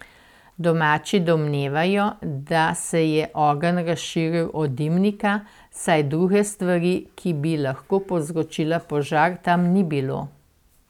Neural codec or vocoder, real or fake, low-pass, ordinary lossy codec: none; real; 19.8 kHz; none